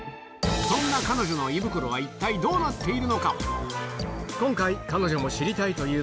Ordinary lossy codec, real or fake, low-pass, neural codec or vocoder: none; real; none; none